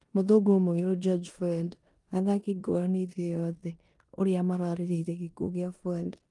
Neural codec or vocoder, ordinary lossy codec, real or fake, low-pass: codec, 16 kHz in and 24 kHz out, 0.9 kbps, LongCat-Audio-Codec, four codebook decoder; Opus, 32 kbps; fake; 10.8 kHz